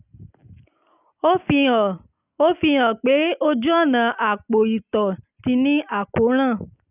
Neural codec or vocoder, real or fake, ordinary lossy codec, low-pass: none; real; none; 3.6 kHz